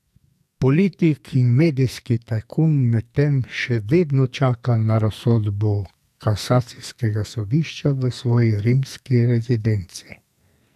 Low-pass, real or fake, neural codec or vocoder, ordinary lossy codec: 14.4 kHz; fake; codec, 44.1 kHz, 2.6 kbps, SNAC; none